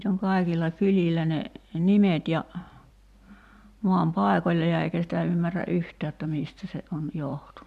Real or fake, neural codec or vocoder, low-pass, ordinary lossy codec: fake; vocoder, 44.1 kHz, 128 mel bands every 256 samples, BigVGAN v2; 14.4 kHz; none